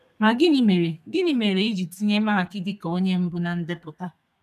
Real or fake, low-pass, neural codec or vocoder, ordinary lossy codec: fake; 14.4 kHz; codec, 32 kHz, 1.9 kbps, SNAC; none